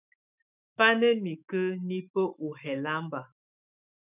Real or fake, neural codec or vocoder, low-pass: fake; autoencoder, 48 kHz, 128 numbers a frame, DAC-VAE, trained on Japanese speech; 3.6 kHz